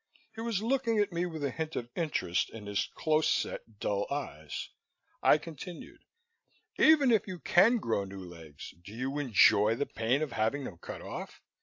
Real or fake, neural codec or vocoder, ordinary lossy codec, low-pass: real; none; AAC, 48 kbps; 7.2 kHz